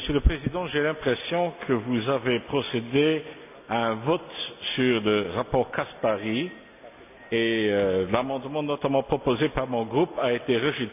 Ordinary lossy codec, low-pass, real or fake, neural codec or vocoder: none; 3.6 kHz; real; none